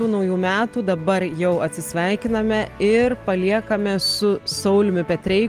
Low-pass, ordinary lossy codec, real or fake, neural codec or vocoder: 14.4 kHz; Opus, 24 kbps; real; none